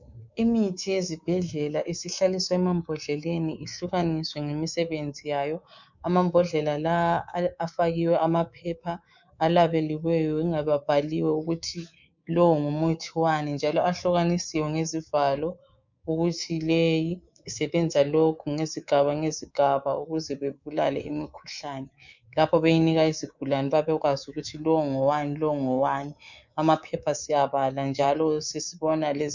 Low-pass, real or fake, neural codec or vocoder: 7.2 kHz; fake; codec, 24 kHz, 3.1 kbps, DualCodec